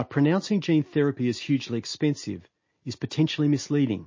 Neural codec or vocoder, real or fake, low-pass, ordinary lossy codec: none; real; 7.2 kHz; MP3, 32 kbps